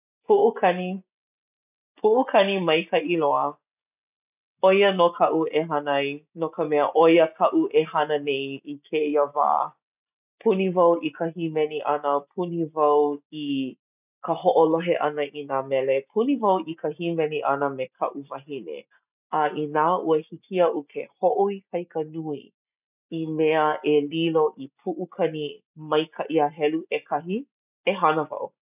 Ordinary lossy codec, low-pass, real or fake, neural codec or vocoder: none; 3.6 kHz; real; none